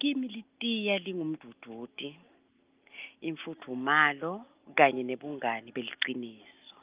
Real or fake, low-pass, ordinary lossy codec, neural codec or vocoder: real; 3.6 kHz; Opus, 24 kbps; none